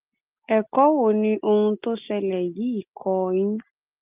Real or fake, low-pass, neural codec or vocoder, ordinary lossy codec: real; 3.6 kHz; none; Opus, 32 kbps